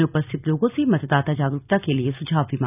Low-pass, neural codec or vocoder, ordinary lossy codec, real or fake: 3.6 kHz; none; none; real